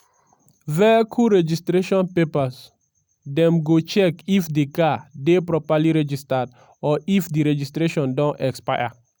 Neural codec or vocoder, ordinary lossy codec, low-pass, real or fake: none; none; none; real